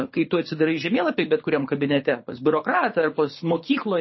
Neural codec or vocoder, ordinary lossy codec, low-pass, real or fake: codec, 24 kHz, 6 kbps, HILCodec; MP3, 24 kbps; 7.2 kHz; fake